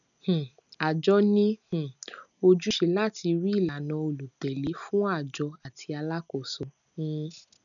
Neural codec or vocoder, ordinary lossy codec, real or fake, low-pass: none; none; real; 7.2 kHz